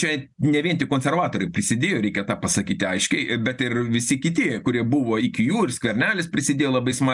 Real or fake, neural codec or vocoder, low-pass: real; none; 10.8 kHz